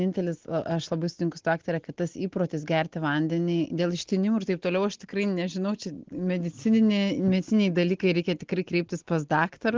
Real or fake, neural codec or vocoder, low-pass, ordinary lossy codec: real; none; 7.2 kHz; Opus, 16 kbps